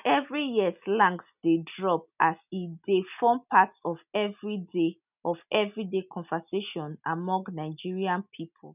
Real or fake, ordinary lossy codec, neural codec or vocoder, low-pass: real; none; none; 3.6 kHz